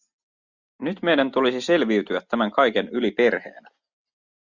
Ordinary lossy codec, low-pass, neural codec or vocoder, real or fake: Opus, 64 kbps; 7.2 kHz; none; real